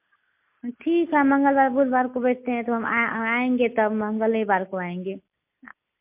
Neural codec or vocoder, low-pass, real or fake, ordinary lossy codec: none; 3.6 kHz; real; MP3, 32 kbps